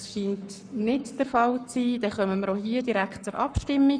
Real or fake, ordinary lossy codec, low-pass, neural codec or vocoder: fake; none; 9.9 kHz; codec, 44.1 kHz, 7.8 kbps, Pupu-Codec